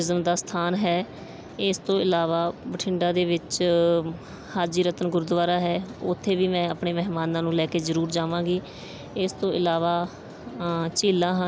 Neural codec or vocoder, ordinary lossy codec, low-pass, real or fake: none; none; none; real